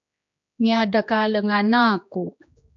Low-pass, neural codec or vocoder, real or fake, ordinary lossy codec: 7.2 kHz; codec, 16 kHz, 2 kbps, X-Codec, HuBERT features, trained on general audio; fake; AAC, 64 kbps